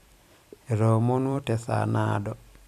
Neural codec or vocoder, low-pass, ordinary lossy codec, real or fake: vocoder, 44.1 kHz, 128 mel bands every 256 samples, BigVGAN v2; 14.4 kHz; none; fake